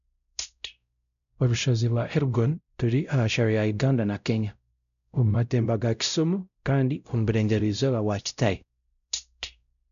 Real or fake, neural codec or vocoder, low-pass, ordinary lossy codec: fake; codec, 16 kHz, 0.5 kbps, X-Codec, WavLM features, trained on Multilingual LibriSpeech; 7.2 kHz; none